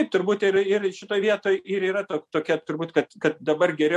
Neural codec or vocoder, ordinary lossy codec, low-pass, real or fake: none; MP3, 64 kbps; 14.4 kHz; real